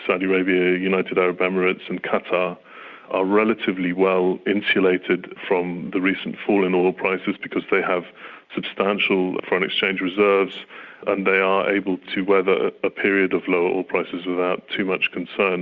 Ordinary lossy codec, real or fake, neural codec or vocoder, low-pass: Opus, 64 kbps; real; none; 7.2 kHz